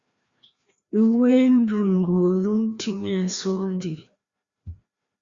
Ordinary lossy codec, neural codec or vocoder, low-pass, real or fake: Opus, 64 kbps; codec, 16 kHz, 1 kbps, FreqCodec, larger model; 7.2 kHz; fake